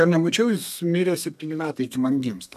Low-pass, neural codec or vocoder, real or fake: 14.4 kHz; codec, 32 kHz, 1.9 kbps, SNAC; fake